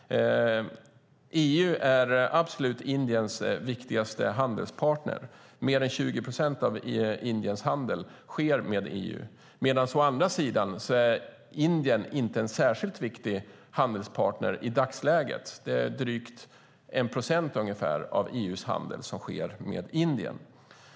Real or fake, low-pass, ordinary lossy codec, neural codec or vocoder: real; none; none; none